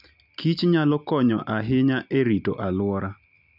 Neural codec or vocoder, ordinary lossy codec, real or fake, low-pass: none; none; real; 5.4 kHz